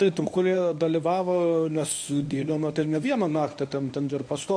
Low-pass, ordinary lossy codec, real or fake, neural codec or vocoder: 9.9 kHz; AAC, 64 kbps; fake; codec, 24 kHz, 0.9 kbps, WavTokenizer, medium speech release version 1